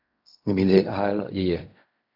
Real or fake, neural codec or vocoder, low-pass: fake; codec, 16 kHz in and 24 kHz out, 0.4 kbps, LongCat-Audio-Codec, fine tuned four codebook decoder; 5.4 kHz